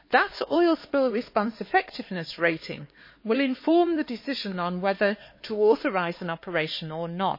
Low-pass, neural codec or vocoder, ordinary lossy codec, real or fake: 5.4 kHz; codec, 16 kHz, 4 kbps, X-Codec, HuBERT features, trained on LibriSpeech; MP3, 24 kbps; fake